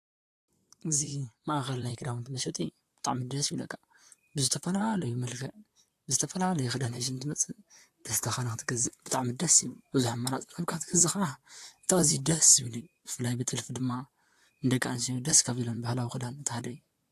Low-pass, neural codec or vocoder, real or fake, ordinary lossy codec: 14.4 kHz; vocoder, 44.1 kHz, 128 mel bands, Pupu-Vocoder; fake; AAC, 64 kbps